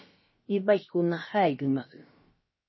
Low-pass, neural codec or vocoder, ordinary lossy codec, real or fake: 7.2 kHz; codec, 16 kHz, about 1 kbps, DyCAST, with the encoder's durations; MP3, 24 kbps; fake